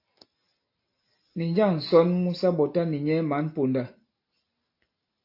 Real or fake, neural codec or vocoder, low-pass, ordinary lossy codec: real; none; 5.4 kHz; AAC, 32 kbps